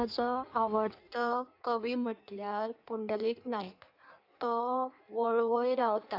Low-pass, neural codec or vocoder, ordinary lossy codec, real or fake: 5.4 kHz; codec, 16 kHz in and 24 kHz out, 1.1 kbps, FireRedTTS-2 codec; none; fake